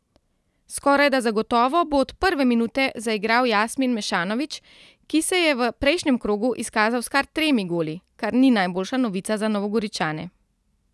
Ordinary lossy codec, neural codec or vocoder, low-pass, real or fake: none; none; none; real